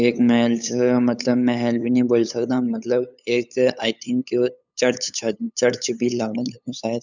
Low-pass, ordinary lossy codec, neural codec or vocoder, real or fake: 7.2 kHz; none; codec, 16 kHz, 8 kbps, FunCodec, trained on LibriTTS, 25 frames a second; fake